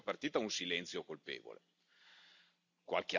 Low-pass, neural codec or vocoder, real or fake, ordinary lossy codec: 7.2 kHz; none; real; none